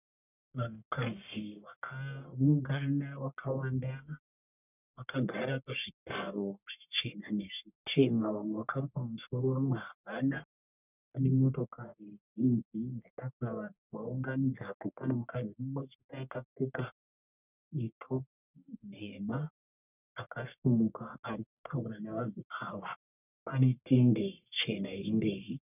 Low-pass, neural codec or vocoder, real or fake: 3.6 kHz; codec, 44.1 kHz, 1.7 kbps, Pupu-Codec; fake